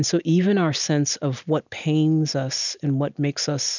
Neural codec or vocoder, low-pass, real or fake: none; 7.2 kHz; real